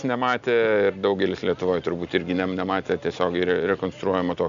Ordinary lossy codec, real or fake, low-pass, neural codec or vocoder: MP3, 64 kbps; real; 7.2 kHz; none